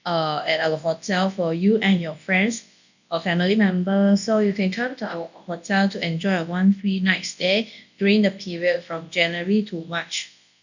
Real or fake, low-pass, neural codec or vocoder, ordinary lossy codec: fake; 7.2 kHz; codec, 24 kHz, 0.9 kbps, WavTokenizer, large speech release; none